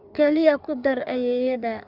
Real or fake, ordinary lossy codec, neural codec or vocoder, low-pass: fake; none; codec, 16 kHz in and 24 kHz out, 1.1 kbps, FireRedTTS-2 codec; 5.4 kHz